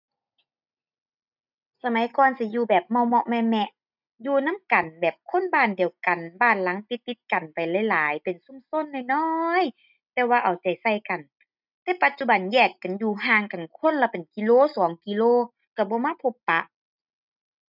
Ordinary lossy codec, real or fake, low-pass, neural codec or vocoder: none; real; 5.4 kHz; none